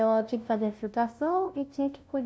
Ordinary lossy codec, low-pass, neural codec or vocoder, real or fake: none; none; codec, 16 kHz, 0.5 kbps, FunCodec, trained on LibriTTS, 25 frames a second; fake